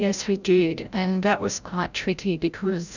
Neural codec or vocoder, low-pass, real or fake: codec, 16 kHz, 0.5 kbps, FreqCodec, larger model; 7.2 kHz; fake